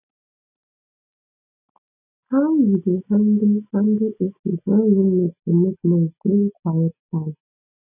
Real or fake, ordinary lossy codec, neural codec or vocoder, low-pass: real; none; none; 3.6 kHz